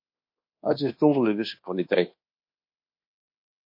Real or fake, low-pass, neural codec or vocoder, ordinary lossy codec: fake; 5.4 kHz; codec, 24 kHz, 1.2 kbps, DualCodec; MP3, 32 kbps